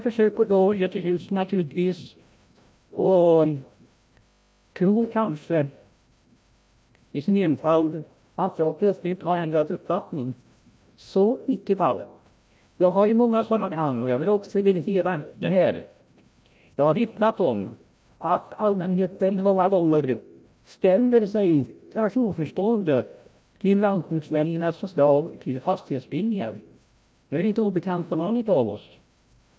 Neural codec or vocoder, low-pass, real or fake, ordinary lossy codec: codec, 16 kHz, 0.5 kbps, FreqCodec, larger model; none; fake; none